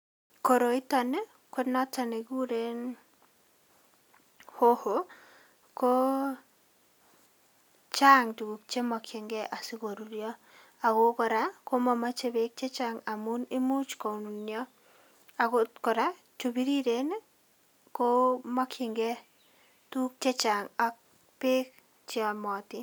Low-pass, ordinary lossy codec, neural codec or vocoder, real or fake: none; none; none; real